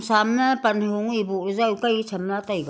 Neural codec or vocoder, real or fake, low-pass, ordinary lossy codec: none; real; none; none